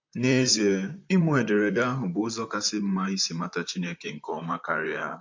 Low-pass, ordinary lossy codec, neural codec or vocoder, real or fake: 7.2 kHz; MP3, 48 kbps; vocoder, 44.1 kHz, 128 mel bands, Pupu-Vocoder; fake